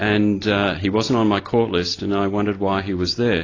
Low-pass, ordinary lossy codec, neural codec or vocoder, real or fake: 7.2 kHz; AAC, 32 kbps; none; real